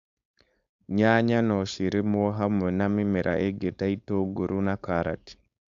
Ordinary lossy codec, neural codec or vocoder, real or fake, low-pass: AAC, 96 kbps; codec, 16 kHz, 4.8 kbps, FACodec; fake; 7.2 kHz